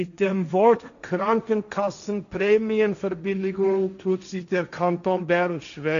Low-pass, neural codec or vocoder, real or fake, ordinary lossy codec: 7.2 kHz; codec, 16 kHz, 1.1 kbps, Voila-Tokenizer; fake; none